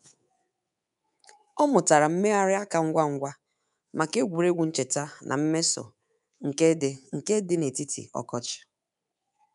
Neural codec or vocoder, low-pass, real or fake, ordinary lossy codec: codec, 24 kHz, 3.1 kbps, DualCodec; 10.8 kHz; fake; none